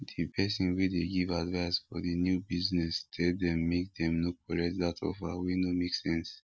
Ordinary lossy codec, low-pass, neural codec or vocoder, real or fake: none; none; none; real